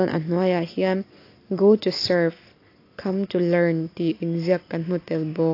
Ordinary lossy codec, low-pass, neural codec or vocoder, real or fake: AAC, 32 kbps; 5.4 kHz; none; real